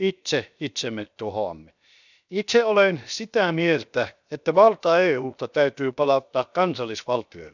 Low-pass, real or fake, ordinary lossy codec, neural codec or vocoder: 7.2 kHz; fake; none; codec, 16 kHz, 0.7 kbps, FocalCodec